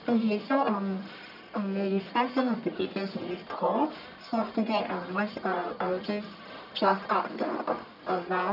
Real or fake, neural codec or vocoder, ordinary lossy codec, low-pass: fake; codec, 44.1 kHz, 1.7 kbps, Pupu-Codec; none; 5.4 kHz